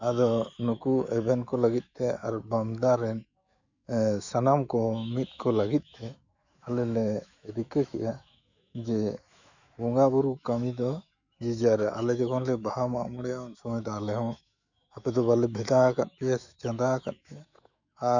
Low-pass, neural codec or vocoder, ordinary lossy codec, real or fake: 7.2 kHz; autoencoder, 48 kHz, 128 numbers a frame, DAC-VAE, trained on Japanese speech; none; fake